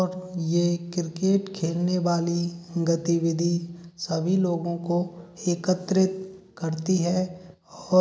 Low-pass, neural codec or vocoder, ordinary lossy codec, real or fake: none; none; none; real